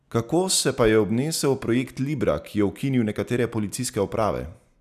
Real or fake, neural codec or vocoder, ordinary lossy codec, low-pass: real; none; none; 14.4 kHz